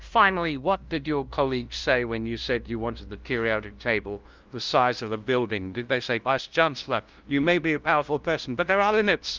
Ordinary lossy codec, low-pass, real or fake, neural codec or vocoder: Opus, 32 kbps; 7.2 kHz; fake; codec, 16 kHz, 0.5 kbps, FunCodec, trained on LibriTTS, 25 frames a second